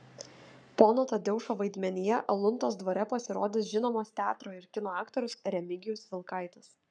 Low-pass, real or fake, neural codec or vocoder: 9.9 kHz; fake; codec, 44.1 kHz, 7.8 kbps, Pupu-Codec